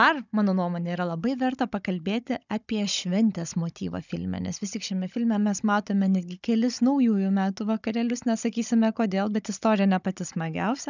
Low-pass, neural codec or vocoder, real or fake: 7.2 kHz; codec, 16 kHz, 16 kbps, FunCodec, trained on Chinese and English, 50 frames a second; fake